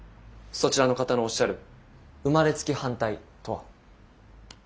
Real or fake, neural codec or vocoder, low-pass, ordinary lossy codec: real; none; none; none